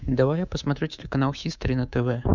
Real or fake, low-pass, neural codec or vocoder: fake; 7.2 kHz; codec, 24 kHz, 3.1 kbps, DualCodec